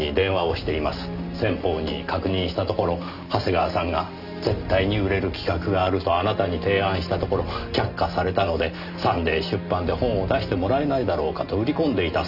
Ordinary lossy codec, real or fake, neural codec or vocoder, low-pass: none; real; none; 5.4 kHz